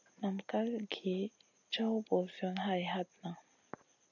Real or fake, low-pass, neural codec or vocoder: real; 7.2 kHz; none